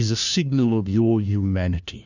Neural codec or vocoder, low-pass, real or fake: codec, 16 kHz, 1 kbps, FunCodec, trained on LibriTTS, 50 frames a second; 7.2 kHz; fake